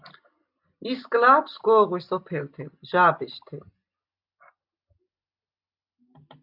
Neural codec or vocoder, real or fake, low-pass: none; real; 5.4 kHz